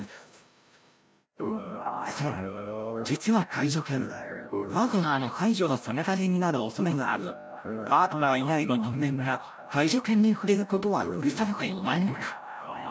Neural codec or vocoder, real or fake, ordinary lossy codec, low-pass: codec, 16 kHz, 0.5 kbps, FreqCodec, larger model; fake; none; none